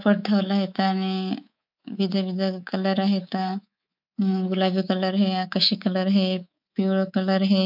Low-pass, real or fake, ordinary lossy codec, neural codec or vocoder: 5.4 kHz; fake; MP3, 48 kbps; codec, 24 kHz, 3.1 kbps, DualCodec